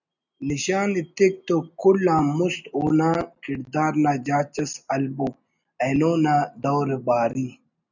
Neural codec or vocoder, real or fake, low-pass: none; real; 7.2 kHz